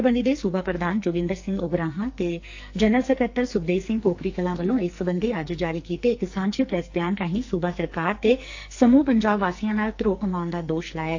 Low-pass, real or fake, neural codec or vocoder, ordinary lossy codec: 7.2 kHz; fake; codec, 44.1 kHz, 2.6 kbps, SNAC; AAC, 48 kbps